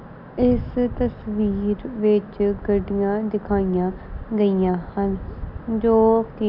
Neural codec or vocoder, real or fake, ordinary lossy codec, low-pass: none; real; none; 5.4 kHz